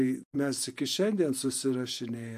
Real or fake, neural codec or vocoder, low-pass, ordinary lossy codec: fake; vocoder, 48 kHz, 128 mel bands, Vocos; 14.4 kHz; MP3, 64 kbps